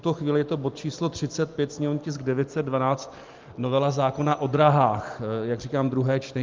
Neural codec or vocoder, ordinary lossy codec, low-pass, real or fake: none; Opus, 24 kbps; 7.2 kHz; real